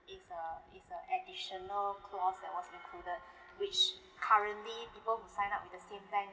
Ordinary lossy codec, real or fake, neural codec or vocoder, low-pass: none; real; none; none